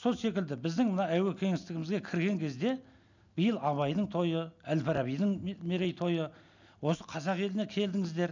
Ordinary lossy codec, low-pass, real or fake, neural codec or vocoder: none; 7.2 kHz; real; none